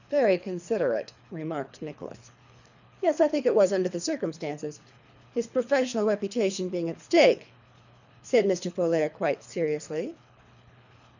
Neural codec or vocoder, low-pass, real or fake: codec, 24 kHz, 3 kbps, HILCodec; 7.2 kHz; fake